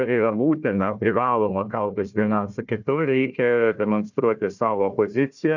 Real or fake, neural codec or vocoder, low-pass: fake; codec, 16 kHz, 1 kbps, FunCodec, trained on Chinese and English, 50 frames a second; 7.2 kHz